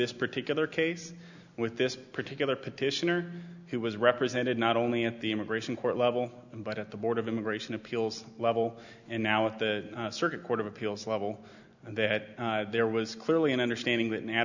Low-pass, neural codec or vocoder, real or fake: 7.2 kHz; none; real